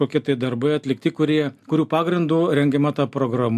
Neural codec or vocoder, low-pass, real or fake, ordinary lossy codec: none; 14.4 kHz; real; AAC, 96 kbps